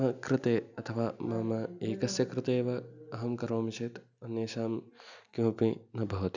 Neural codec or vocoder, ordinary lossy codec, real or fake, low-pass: none; none; real; 7.2 kHz